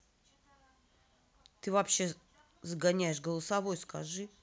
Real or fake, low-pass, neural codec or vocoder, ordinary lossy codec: real; none; none; none